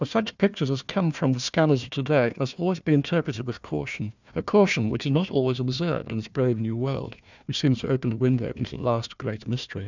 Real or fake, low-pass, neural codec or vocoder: fake; 7.2 kHz; codec, 16 kHz, 1 kbps, FunCodec, trained on Chinese and English, 50 frames a second